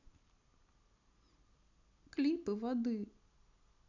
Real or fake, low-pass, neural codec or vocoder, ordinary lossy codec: real; 7.2 kHz; none; none